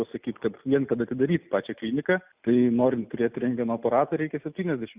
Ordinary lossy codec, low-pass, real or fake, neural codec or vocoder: Opus, 64 kbps; 3.6 kHz; real; none